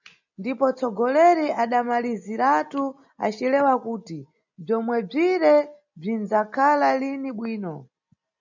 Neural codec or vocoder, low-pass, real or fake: none; 7.2 kHz; real